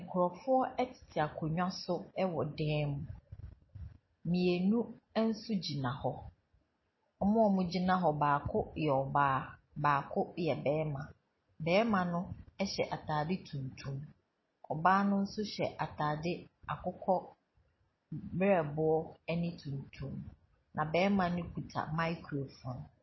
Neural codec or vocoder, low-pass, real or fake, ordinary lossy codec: none; 7.2 kHz; real; MP3, 24 kbps